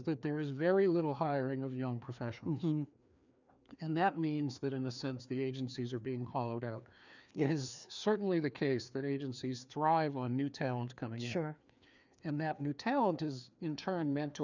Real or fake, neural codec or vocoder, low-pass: fake; codec, 16 kHz, 2 kbps, FreqCodec, larger model; 7.2 kHz